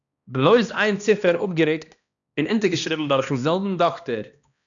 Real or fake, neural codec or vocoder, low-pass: fake; codec, 16 kHz, 1 kbps, X-Codec, HuBERT features, trained on balanced general audio; 7.2 kHz